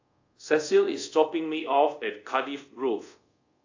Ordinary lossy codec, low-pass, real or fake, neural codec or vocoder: AAC, 48 kbps; 7.2 kHz; fake; codec, 24 kHz, 0.5 kbps, DualCodec